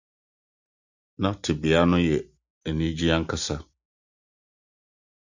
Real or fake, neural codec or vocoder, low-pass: real; none; 7.2 kHz